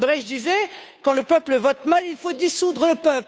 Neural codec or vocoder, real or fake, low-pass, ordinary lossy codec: codec, 16 kHz, 2 kbps, FunCodec, trained on Chinese and English, 25 frames a second; fake; none; none